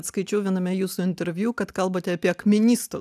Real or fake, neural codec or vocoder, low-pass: real; none; 14.4 kHz